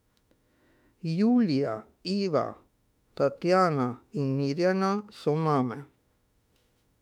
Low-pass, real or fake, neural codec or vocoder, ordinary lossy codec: 19.8 kHz; fake; autoencoder, 48 kHz, 32 numbers a frame, DAC-VAE, trained on Japanese speech; none